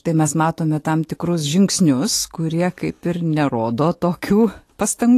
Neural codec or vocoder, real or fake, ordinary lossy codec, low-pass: autoencoder, 48 kHz, 128 numbers a frame, DAC-VAE, trained on Japanese speech; fake; AAC, 48 kbps; 14.4 kHz